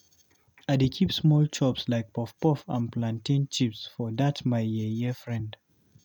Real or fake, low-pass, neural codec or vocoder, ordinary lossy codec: real; 19.8 kHz; none; none